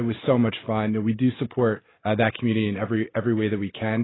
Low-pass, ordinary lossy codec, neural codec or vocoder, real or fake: 7.2 kHz; AAC, 16 kbps; none; real